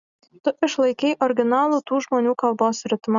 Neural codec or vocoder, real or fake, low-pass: none; real; 7.2 kHz